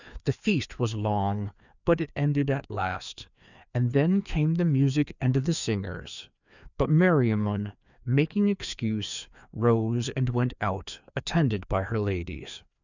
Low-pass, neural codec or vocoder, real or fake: 7.2 kHz; codec, 16 kHz, 2 kbps, FreqCodec, larger model; fake